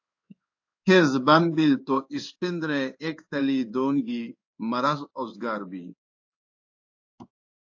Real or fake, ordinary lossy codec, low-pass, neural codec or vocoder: fake; AAC, 48 kbps; 7.2 kHz; codec, 16 kHz in and 24 kHz out, 1 kbps, XY-Tokenizer